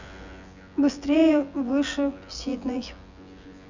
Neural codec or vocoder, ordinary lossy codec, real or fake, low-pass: vocoder, 24 kHz, 100 mel bands, Vocos; Opus, 64 kbps; fake; 7.2 kHz